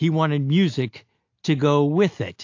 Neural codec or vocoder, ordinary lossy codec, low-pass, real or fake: none; AAC, 48 kbps; 7.2 kHz; real